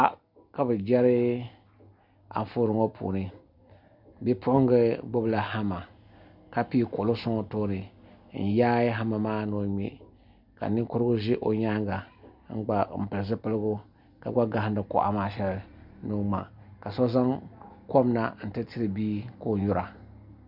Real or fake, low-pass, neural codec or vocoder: real; 5.4 kHz; none